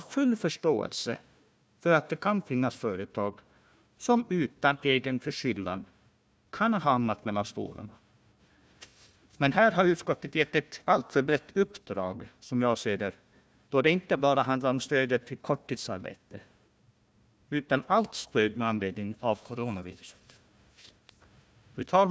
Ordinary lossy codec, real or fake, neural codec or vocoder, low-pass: none; fake; codec, 16 kHz, 1 kbps, FunCodec, trained on Chinese and English, 50 frames a second; none